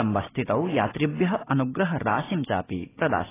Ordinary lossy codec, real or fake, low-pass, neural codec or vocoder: AAC, 16 kbps; real; 3.6 kHz; none